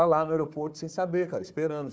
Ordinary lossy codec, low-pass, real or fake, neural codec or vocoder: none; none; fake; codec, 16 kHz, 4 kbps, FunCodec, trained on Chinese and English, 50 frames a second